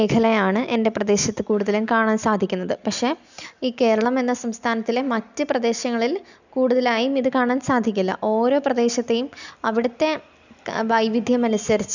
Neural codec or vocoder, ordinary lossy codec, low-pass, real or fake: none; none; 7.2 kHz; real